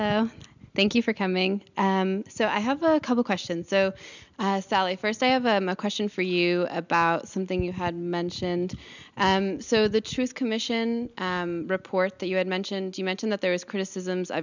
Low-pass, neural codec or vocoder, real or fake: 7.2 kHz; none; real